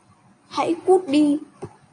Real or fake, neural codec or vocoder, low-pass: real; none; 9.9 kHz